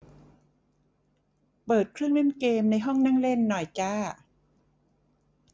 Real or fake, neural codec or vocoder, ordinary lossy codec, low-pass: real; none; none; none